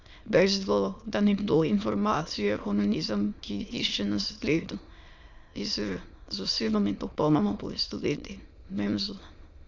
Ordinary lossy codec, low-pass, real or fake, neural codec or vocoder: Opus, 64 kbps; 7.2 kHz; fake; autoencoder, 22.05 kHz, a latent of 192 numbers a frame, VITS, trained on many speakers